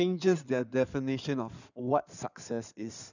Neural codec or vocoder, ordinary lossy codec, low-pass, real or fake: codec, 16 kHz in and 24 kHz out, 2.2 kbps, FireRedTTS-2 codec; none; 7.2 kHz; fake